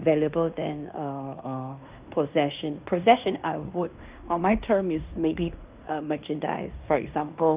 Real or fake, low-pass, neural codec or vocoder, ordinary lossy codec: fake; 3.6 kHz; codec, 16 kHz in and 24 kHz out, 0.9 kbps, LongCat-Audio-Codec, fine tuned four codebook decoder; Opus, 24 kbps